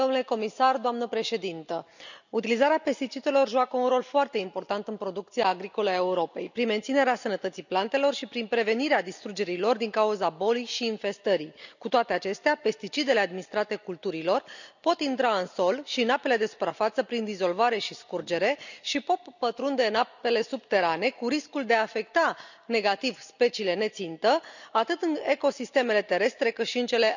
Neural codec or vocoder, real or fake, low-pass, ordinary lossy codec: none; real; 7.2 kHz; none